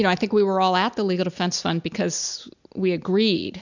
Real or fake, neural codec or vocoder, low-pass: real; none; 7.2 kHz